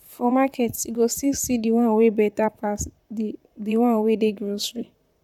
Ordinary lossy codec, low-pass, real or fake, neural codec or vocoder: none; 19.8 kHz; fake; vocoder, 44.1 kHz, 128 mel bands, Pupu-Vocoder